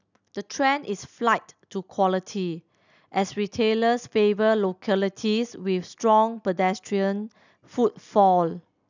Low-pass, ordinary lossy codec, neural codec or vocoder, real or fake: 7.2 kHz; none; none; real